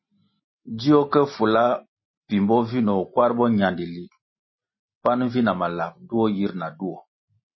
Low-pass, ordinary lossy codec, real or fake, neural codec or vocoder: 7.2 kHz; MP3, 24 kbps; real; none